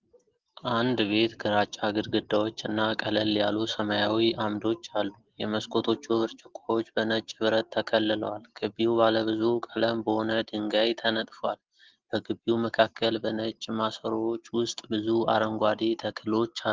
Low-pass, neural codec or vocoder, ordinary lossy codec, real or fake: 7.2 kHz; none; Opus, 16 kbps; real